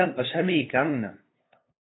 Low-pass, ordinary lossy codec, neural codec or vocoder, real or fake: 7.2 kHz; AAC, 16 kbps; codec, 16 kHz, 2 kbps, FunCodec, trained on LibriTTS, 25 frames a second; fake